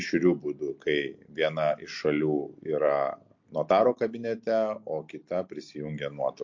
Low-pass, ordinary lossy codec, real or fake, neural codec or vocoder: 7.2 kHz; MP3, 48 kbps; real; none